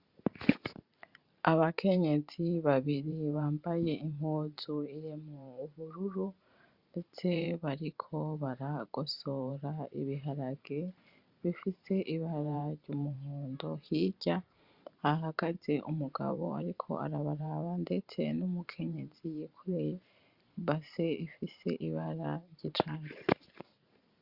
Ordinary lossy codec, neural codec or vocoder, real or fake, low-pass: Opus, 64 kbps; vocoder, 44.1 kHz, 128 mel bands every 512 samples, BigVGAN v2; fake; 5.4 kHz